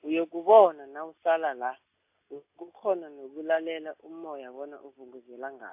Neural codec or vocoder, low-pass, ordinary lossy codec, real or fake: none; 3.6 kHz; AAC, 32 kbps; real